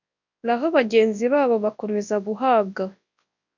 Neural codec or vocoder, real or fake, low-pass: codec, 24 kHz, 0.9 kbps, WavTokenizer, large speech release; fake; 7.2 kHz